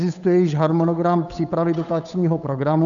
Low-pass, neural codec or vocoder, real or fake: 7.2 kHz; codec, 16 kHz, 8 kbps, FunCodec, trained on Chinese and English, 25 frames a second; fake